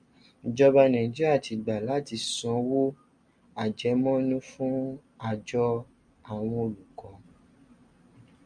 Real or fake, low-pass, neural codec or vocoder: real; 9.9 kHz; none